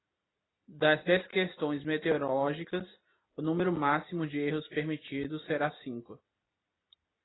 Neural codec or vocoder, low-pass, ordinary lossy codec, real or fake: none; 7.2 kHz; AAC, 16 kbps; real